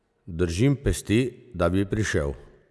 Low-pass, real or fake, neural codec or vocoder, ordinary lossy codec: none; real; none; none